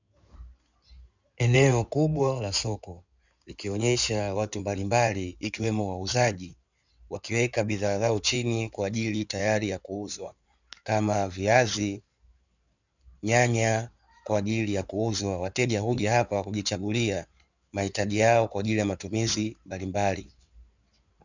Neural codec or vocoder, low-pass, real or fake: codec, 16 kHz in and 24 kHz out, 2.2 kbps, FireRedTTS-2 codec; 7.2 kHz; fake